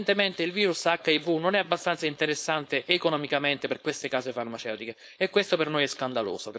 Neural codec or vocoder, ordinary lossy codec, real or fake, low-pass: codec, 16 kHz, 4.8 kbps, FACodec; none; fake; none